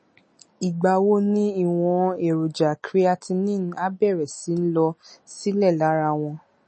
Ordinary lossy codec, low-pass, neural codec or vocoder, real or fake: MP3, 32 kbps; 9.9 kHz; none; real